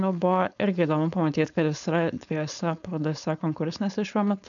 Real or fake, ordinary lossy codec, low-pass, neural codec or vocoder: fake; MP3, 96 kbps; 7.2 kHz; codec, 16 kHz, 4.8 kbps, FACodec